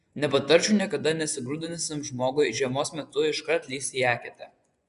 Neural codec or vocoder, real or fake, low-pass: vocoder, 24 kHz, 100 mel bands, Vocos; fake; 10.8 kHz